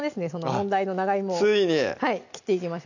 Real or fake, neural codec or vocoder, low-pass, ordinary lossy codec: real; none; 7.2 kHz; none